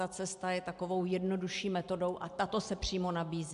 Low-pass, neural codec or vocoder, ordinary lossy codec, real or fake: 10.8 kHz; none; AAC, 96 kbps; real